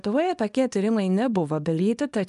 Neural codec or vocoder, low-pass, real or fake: codec, 24 kHz, 0.9 kbps, WavTokenizer, small release; 10.8 kHz; fake